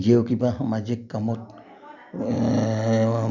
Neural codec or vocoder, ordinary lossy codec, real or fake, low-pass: none; none; real; 7.2 kHz